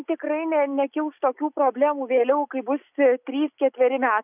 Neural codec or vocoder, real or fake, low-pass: none; real; 3.6 kHz